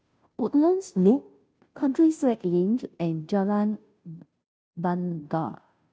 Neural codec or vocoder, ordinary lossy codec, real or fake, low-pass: codec, 16 kHz, 0.5 kbps, FunCodec, trained on Chinese and English, 25 frames a second; none; fake; none